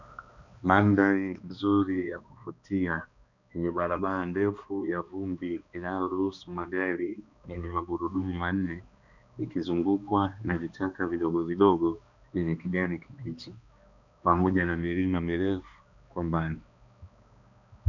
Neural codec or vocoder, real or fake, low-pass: codec, 16 kHz, 2 kbps, X-Codec, HuBERT features, trained on balanced general audio; fake; 7.2 kHz